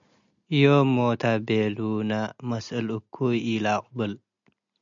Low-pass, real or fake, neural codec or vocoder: 7.2 kHz; real; none